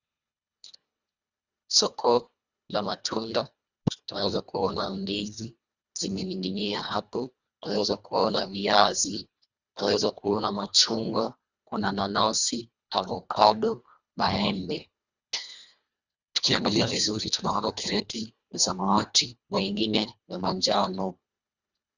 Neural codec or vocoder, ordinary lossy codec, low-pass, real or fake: codec, 24 kHz, 1.5 kbps, HILCodec; Opus, 64 kbps; 7.2 kHz; fake